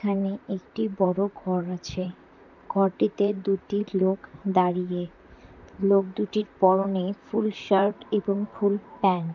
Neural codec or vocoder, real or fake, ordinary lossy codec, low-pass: vocoder, 44.1 kHz, 128 mel bands, Pupu-Vocoder; fake; none; 7.2 kHz